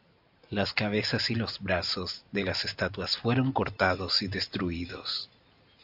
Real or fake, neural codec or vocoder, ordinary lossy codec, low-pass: real; none; AAC, 48 kbps; 5.4 kHz